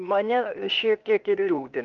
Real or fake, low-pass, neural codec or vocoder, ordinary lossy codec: fake; 7.2 kHz; codec, 16 kHz, 0.8 kbps, ZipCodec; Opus, 24 kbps